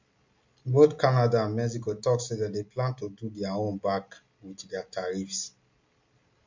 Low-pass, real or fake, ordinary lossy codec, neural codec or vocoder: 7.2 kHz; real; MP3, 48 kbps; none